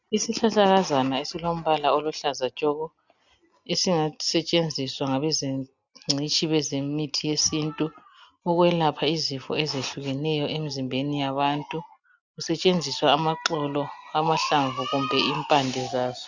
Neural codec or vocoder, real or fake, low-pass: none; real; 7.2 kHz